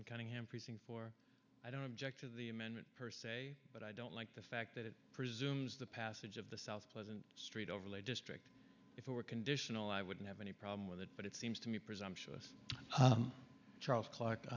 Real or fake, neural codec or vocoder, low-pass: real; none; 7.2 kHz